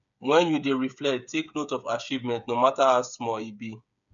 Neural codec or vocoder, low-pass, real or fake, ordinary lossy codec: codec, 16 kHz, 8 kbps, FreqCodec, smaller model; 7.2 kHz; fake; none